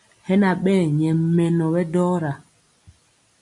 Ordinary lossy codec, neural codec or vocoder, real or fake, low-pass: MP3, 64 kbps; none; real; 10.8 kHz